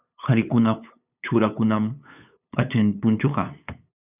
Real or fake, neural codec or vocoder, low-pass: fake; codec, 16 kHz, 8 kbps, FunCodec, trained on LibriTTS, 25 frames a second; 3.6 kHz